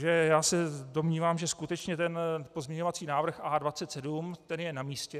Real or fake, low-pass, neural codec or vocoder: real; 14.4 kHz; none